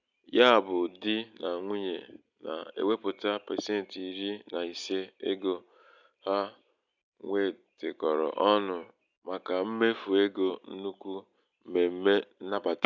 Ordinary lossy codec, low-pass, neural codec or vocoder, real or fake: none; 7.2 kHz; none; real